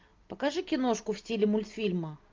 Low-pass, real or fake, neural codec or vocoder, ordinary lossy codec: 7.2 kHz; real; none; Opus, 24 kbps